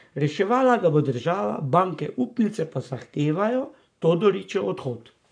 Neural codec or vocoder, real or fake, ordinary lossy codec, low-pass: codec, 44.1 kHz, 7.8 kbps, Pupu-Codec; fake; none; 9.9 kHz